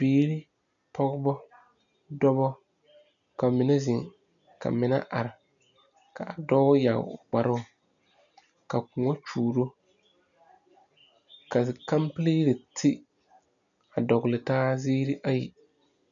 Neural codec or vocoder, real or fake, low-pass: none; real; 7.2 kHz